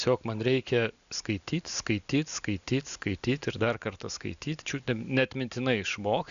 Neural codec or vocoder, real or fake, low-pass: none; real; 7.2 kHz